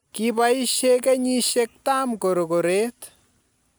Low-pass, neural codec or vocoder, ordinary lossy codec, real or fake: none; none; none; real